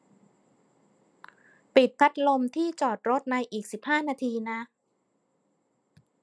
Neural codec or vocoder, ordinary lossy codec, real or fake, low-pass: none; none; real; none